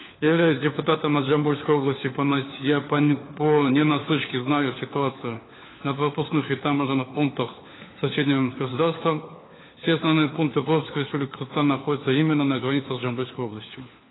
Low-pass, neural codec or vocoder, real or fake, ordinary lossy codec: 7.2 kHz; codec, 16 kHz, 4 kbps, FunCodec, trained on LibriTTS, 50 frames a second; fake; AAC, 16 kbps